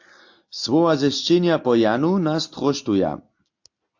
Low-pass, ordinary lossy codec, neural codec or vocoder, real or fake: 7.2 kHz; AAC, 48 kbps; none; real